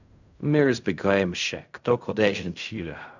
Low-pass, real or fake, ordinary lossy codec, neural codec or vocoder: 7.2 kHz; fake; none; codec, 16 kHz in and 24 kHz out, 0.4 kbps, LongCat-Audio-Codec, fine tuned four codebook decoder